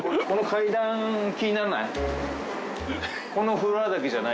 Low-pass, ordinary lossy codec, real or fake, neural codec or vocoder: none; none; real; none